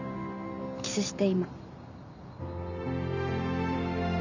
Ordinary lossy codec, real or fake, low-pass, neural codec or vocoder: none; real; 7.2 kHz; none